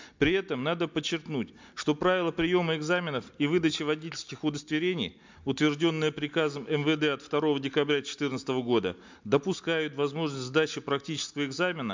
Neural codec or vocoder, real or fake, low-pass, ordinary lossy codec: none; real; 7.2 kHz; MP3, 64 kbps